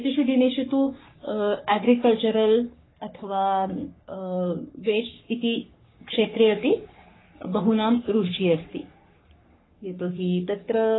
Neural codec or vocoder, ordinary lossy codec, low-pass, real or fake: codec, 44.1 kHz, 3.4 kbps, Pupu-Codec; AAC, 16 kbps; 7.2 kHz; fake